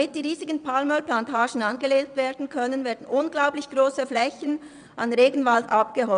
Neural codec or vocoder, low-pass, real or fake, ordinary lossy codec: vocoder, 22.05 kHz, 80 mel bands, WaveNeXt; 9.9 kHz; fake; none